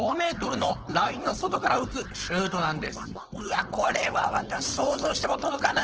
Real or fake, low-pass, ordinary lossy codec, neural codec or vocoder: fake; 7.2 kHz; Opus, 16 kbps; codec, 16 kHz, 4.8 kbps, FACodec